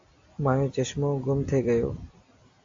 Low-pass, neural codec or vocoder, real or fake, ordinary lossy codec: 7.2 kHz; none; real; AAC, 48 kbps